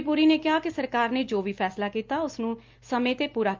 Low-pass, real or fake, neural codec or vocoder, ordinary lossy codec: 7.2 kHz; real; none; Opus, 32 kbps